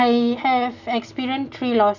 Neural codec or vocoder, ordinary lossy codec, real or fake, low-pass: none; none; real; 7.2 kHz